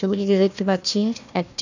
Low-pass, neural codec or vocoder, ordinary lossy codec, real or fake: 7.2 kHz; codec, 16 kHz, 1 kbps, FunCodec, trained on Chinese and English, 50 frames a second; none; fake